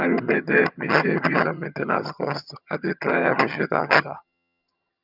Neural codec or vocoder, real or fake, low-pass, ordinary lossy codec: vocoder, 22.05 kHz, 80 mel bands, HiFi-GAN; fake; 5.4 kHz; none